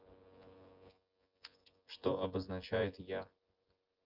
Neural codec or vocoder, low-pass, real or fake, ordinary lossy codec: vocoder, 24 kHz, 100 mel bands, Vocos; 5.4 kHz; fake; Opus, 32 kbps